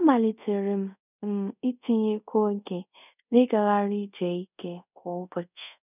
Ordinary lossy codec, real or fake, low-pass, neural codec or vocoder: none; fake; 3.6 kHz; codec, 24 kHz, 0.5 kbps, DualCodec